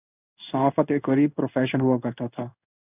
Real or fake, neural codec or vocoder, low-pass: fake; codec, 16 kHz in and 24 kHz out, 1 kbps, XY-Tokenizer; 3.6 kHz